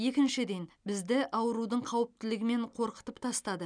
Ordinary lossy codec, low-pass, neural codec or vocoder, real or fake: none; 9.9 kHz; none; real